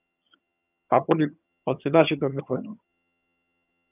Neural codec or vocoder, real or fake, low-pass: vocoder, 22.05 kHz, 80 mel bands, HiFi-GAN; fake; 3.6 kHz